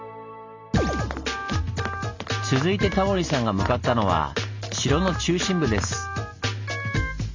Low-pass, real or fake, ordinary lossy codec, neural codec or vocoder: 7.2 kHz; real; MP3, 64 kbps; none